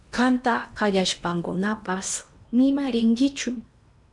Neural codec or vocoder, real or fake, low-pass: codec, 16 kHz in and 24 kHz out, 0.8 kbps, FocalCodec, streaming, 65536 codes; fake; 10.8 kHz